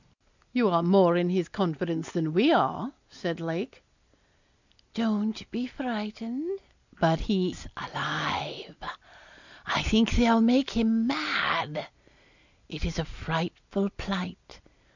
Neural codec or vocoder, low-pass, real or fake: none; 7.2 kHz; real